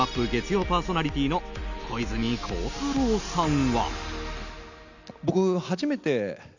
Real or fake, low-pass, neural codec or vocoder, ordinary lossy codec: real; 7.2 kHz; none; none